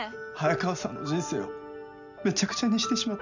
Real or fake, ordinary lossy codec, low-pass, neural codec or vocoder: real; none; 7.2 kHz; none